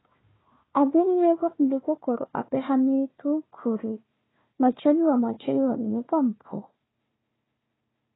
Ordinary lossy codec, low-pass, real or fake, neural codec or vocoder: AAC, 16 kbps; 7.2 kHz; fake; codec, 16 kHz, 1 kbps, FunCodec, trained on Chinese and English, 50 frames a second